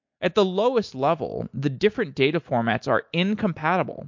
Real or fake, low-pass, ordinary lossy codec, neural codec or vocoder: real; 7.2 kHz; MP3, 48 kbps; none